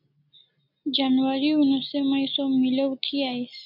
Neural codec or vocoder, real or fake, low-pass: none; real; 5.4 kHz